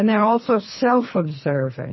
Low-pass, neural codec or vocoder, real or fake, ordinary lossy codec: 7.2 kHz; codec, 24 kHz, 3 kbps, HILCodec; fake; MP3, 24 kbps